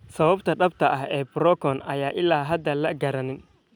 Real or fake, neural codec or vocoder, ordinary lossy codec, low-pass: real; none; none; 19.8 kHz